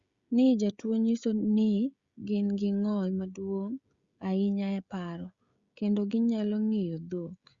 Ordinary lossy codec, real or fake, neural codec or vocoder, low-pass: Opus, 64 kbps; fake; codec, 16 kHz, 16 kbps, FreqCodec, smaller model; 7.2 kHz